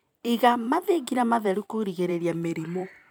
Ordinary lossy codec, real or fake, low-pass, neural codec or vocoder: none; fake; none; vocoder, 44.1 kHz, 128 mel bands every 256 samples, BigVGAN v2